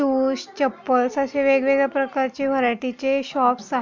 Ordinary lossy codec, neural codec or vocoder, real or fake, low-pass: MP3, 64 kbps; none; real; 7.2 kHz